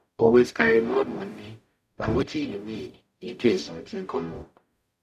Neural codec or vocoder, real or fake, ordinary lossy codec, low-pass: codec, 44.1 kHz, 0.9 kbps, DAC; fake; none; 14.4 kHz